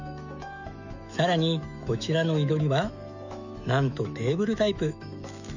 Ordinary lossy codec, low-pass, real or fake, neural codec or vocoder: none; 7.2 kHz; fake; autoencoder, 48 kHz, 128 numbers a frame, DAC-VAE, trained on Japanese speech